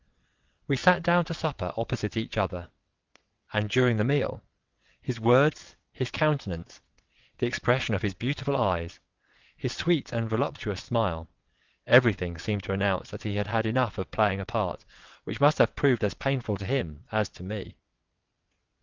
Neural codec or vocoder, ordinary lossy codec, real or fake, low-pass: vocoder, 22.05 kHz, 80 mel bands, WaveNeXt; Opus, 24 kbps; fake; 7.2 kHz